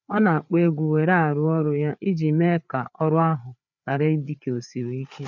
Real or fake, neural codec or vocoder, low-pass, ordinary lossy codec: fake; codec, 16 kHz, 4 kbps, FreqCodec, larger model; 7.2 kHz; none